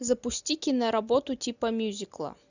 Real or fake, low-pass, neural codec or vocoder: real; 7.2 kHz; none